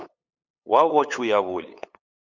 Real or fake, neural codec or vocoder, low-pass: fake; codec, 16 kHz, 8 kbps, FunCodec, trained on LibriTTS, 25 frames a second; 7.2 kHz